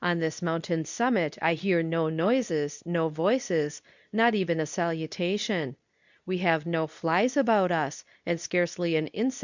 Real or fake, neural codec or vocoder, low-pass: fake; codec, 24 kHz, 0.9 kbps, WavTokenizer, medium speech release version 2; 7.2 kHz